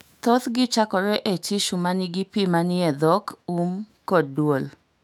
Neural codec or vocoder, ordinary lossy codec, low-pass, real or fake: autoencoder, 48 kHz, 128 numbers a frame, DAC-VAE, trained on Japanese speech; none; 19.8 kHz; fake